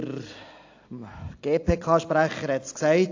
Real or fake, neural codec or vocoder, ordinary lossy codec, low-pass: real; none; AAC, 48 kbps; 7.2 kHz